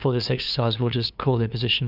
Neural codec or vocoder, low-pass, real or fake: codec, 16 kHz, 2 kbps, FunCodec, trained on LibriTTS, 25 frames a second; 5.4 kHz; fake